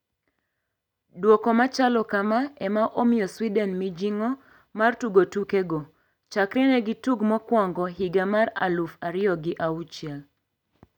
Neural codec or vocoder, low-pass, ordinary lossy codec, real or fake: none; 19.8 kHz; none; real